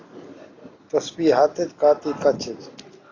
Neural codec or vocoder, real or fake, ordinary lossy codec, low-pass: none; real; AAC, 32 kbps; 7.2 kHz